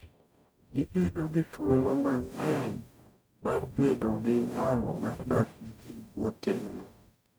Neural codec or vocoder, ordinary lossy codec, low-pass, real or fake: codec, 44.1 kHz, 0.9 kbps, DAC; none; none; fake